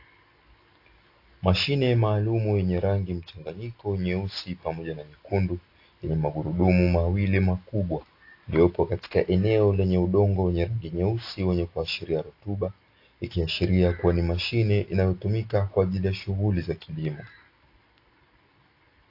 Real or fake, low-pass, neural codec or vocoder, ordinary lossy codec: real; 5.4 kHz; none; AAC, 32 kbps